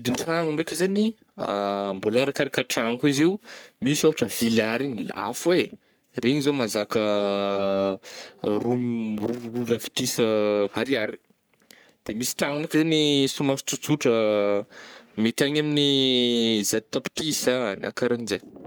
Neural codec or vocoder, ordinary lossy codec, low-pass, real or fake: codec, 44.1 kHz, 3.4 kbps, Pupu-Codec; none; none; fake